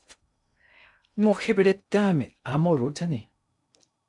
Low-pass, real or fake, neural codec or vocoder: 10.8 kHz; fake; codec, 16 kHz in and 24 kHz out, 0.6 kbps, FocalCodec, streaming, 2048 codes